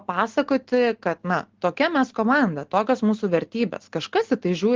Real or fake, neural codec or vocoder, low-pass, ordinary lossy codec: real; none; 7.2 kHz; Opus, 16 kbps